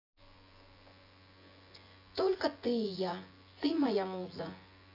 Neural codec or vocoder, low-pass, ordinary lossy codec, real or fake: vocoder, 24 kHz, 100 mel bands, Vocos; 5.4 kHz; AAC, 32 kbps; fake